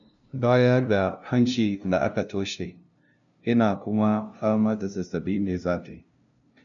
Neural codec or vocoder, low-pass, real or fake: codec, 16 kHz, 0.5 kbps, FunCodec, trained on LibriTTS, 25 frames a second; 7.2 kHz; fake